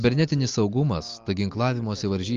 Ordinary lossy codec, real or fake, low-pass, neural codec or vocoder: Opus, 32 kbps; real; 7.2 kHz; none